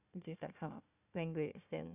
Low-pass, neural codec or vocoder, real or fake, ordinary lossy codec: 3.6 kHz; codec, 16 kHz, 1 kbps, FunCodec, trained on Chinese and English, 50 frames a second; fake; none